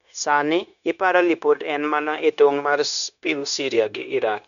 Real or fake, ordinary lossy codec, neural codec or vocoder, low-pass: fake; none; codec, 16 kHz, 0.9 kbps, LongCat-Audio-Codec; 7.2 kHz